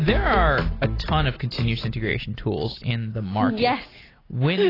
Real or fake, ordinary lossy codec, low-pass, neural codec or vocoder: real; AAC, 24 kbps; 5.4 kHz; none